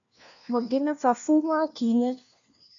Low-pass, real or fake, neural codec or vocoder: 7.2 kHz; fake; codec, 16 kHz, 1 kbps, FunCodec, trained on LibriTTS, 50 frames a second